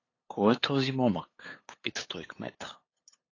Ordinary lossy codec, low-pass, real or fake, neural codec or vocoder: AAC, 32 kbps; 7.2 kHz; fake; codec, 16 kHz, 8 kbps, FunCodec, trained on LibriTTS, 25 frames a second